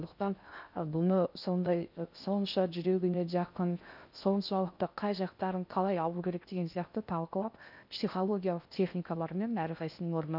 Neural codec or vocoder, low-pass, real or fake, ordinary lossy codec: codec, 16 kHz in and 24 kHz out, 0.6 kbps, FocalCodec, streaming, 2048 codes; 5.4 kHz; fake; none